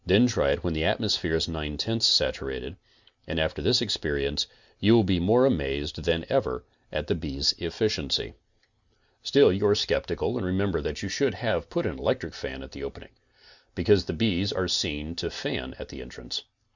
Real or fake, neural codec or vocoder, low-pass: real; none; 7.2 kHz